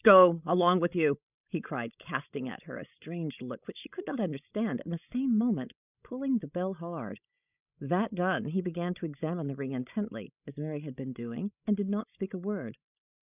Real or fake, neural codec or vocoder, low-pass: fake; codec, 16 kHz, 16 kbps, FreqCodec, larger model; 3.6 kHz